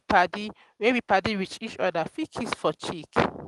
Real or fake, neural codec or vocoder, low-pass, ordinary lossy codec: real; none; 10.8 kHz; Opus, 24 kbps